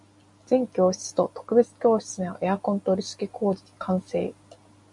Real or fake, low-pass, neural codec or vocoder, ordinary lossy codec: real; 10.8 kHz; none; MP3, 96 kbps